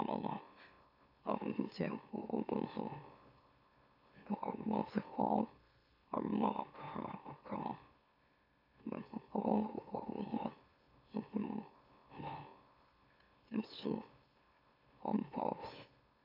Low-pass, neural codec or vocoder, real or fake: 5.4 kHz; autoencoder, 44.1 kHz, a latent of 192 numbers a frame, MeloTTS; fake